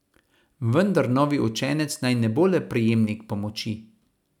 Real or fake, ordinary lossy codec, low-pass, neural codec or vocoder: real; none; 19.8 kHz; none